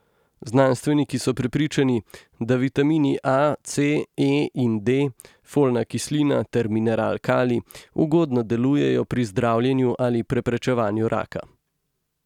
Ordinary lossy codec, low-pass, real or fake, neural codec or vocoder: none; 19.8 kHz; real; none